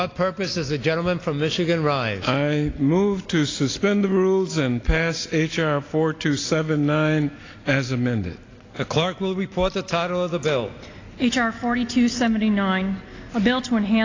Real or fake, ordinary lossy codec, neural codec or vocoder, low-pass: real; AAC, 32 kbps; none; 7.2 kHz